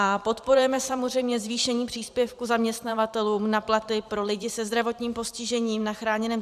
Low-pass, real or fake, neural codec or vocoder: 14.4 kHz; fake; vocoder, 44.1 kHz, 128 mel bands every 512 samples, BigVGAN v2